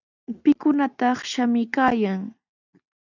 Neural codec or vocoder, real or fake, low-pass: none; real; 7.2 kHz